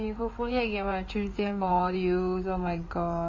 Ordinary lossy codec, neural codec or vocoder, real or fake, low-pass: MP3, 32 kbps; codec, 16 kHz in and 24 kHz out, 2.2 kbps, FireRedTTS-2 codec; fake; 7.2 kHz